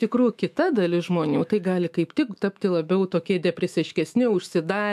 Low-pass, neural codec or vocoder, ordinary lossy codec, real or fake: 14.4 kHz; autoencoder, 48 kHz, 128 numbers a frame, DAC-VAE, trained on Japanese speech; AAC, 96 kbps; fake